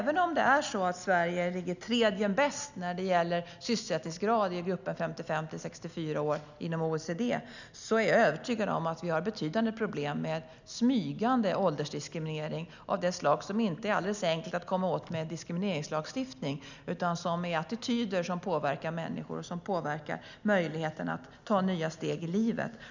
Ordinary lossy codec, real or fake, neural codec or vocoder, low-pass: none; real; none; 7.2 kHz